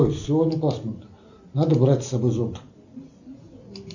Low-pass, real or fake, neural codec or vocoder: 7.2 kHz; real; none